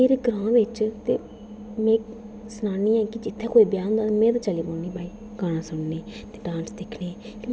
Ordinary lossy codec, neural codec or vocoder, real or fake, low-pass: none; none; real; none